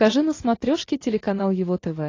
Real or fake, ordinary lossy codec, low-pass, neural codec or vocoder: real; AAC, 32 kbps; 7.2 kHz; none